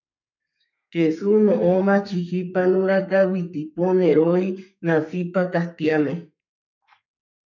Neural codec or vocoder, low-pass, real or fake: codec, 32 kHz, 1.9 kbps, SNAC; 7.2 kHz; fake